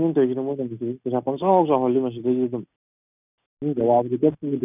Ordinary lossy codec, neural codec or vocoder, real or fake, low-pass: none; none; real; 3.6 kHz